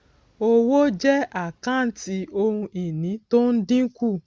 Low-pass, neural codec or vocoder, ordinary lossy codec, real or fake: none; none; none; real